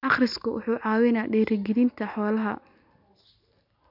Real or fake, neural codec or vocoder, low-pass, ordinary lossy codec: real; none; 5.4 kHz; none